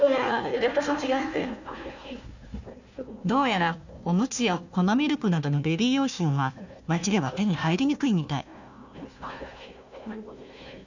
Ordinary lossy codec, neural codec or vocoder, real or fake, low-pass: none; codec, 16 kHz, 1 kbps, FunCodec, trained on Chinese and English, 50 frames a second; fake; 7.2 kHz